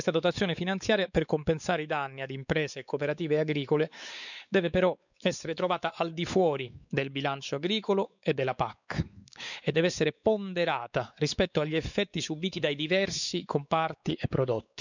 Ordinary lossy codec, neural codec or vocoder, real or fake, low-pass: none; codec, 16 kHz, 4 kbps, X-Codec, WavLM features, trained on Multilingual LibriSpeech; fake; 7.2 kHz